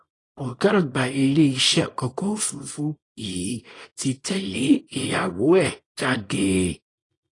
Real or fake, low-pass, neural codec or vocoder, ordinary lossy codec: fake; 10.8 kHz; codec, 24 kHz, 0.9 kbps, WavTokenizer, small release; AAC, 32 kbps